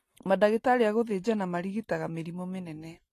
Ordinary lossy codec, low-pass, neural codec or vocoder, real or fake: AAC, 48 kbps; 14.4 kHz; none; real